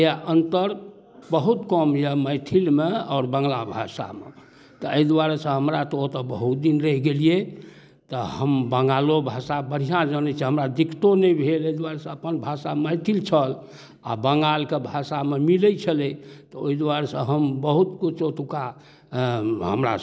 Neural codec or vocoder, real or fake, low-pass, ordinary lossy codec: none; real; none; none